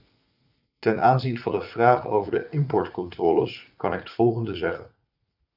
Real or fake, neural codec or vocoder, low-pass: fake; vocoder, 44.1 kHz, 80 mel bands, Vocos; 5.4 kHz